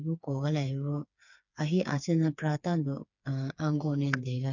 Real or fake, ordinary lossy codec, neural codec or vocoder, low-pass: fake; none; codec, 16 kHz, 4 kbps, FreqCodec, smaller model; 7.2 kHz